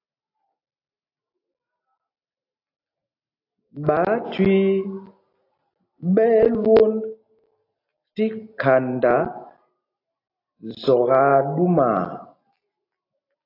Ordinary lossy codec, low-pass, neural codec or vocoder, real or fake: AAC, 32 kbps; 5.4 kHz; none; real